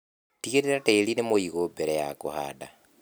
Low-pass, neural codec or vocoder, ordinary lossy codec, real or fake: none; none; none; real